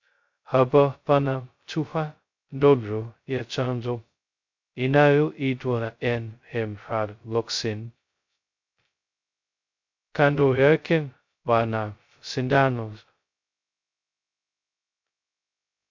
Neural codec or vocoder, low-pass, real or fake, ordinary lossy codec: codec, 16 kHz, 0.2 kbps, FocalCodec; 7.2 kHz; fake; MP3, 64 kbps